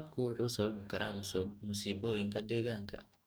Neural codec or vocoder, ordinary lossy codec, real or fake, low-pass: codec, 44.1 kHz, 2.6 kbps, DAC; none; fake; none